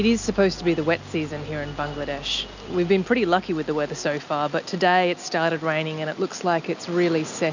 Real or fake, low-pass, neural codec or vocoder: real; 7.2 kHz; none